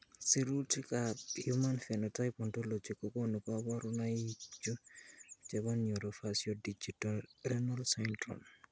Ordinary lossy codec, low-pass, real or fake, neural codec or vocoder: none; none; real; none